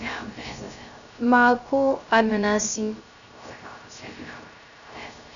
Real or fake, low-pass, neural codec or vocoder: fake; 7.2 kHz; codec, 16 kHz, 0.3 kbps, FocalCodec